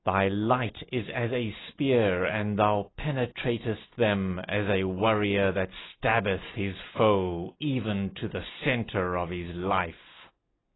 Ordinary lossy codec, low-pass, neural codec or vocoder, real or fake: AAC, 16 kbps; 7.2 kHz; none; real